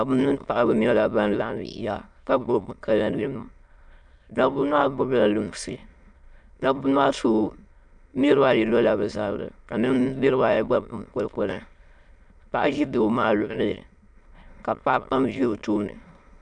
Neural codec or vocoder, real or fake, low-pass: autoencoder, 22.05 kHz, a latent of 192 numbers a frame, VITS, trained on many speakers; fake; 9.9 kHz